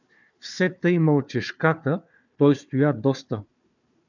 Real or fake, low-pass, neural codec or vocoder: fake; 7.2 kHz; codec, 16 kHz, 4 kbps, FunCodec, trained on Chinese and English, 50 frames a second